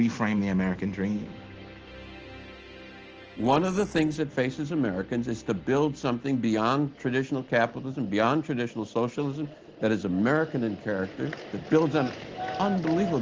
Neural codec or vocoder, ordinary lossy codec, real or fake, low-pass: none; Opus, 16 kbps; real; 7.2 kHz